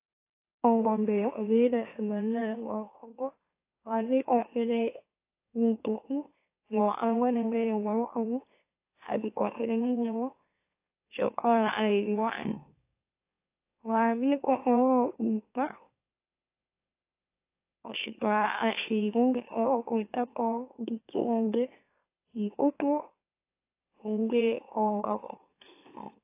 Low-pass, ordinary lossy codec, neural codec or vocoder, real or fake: 3.6 kHz; AAC, 24 kbps; autoencoder, 44.1 kHz, a latent of 192 numbers a frame, MeloTTS; fake